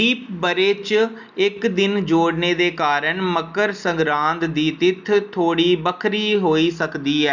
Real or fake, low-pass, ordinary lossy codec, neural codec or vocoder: real; 7.2 kHz; none; none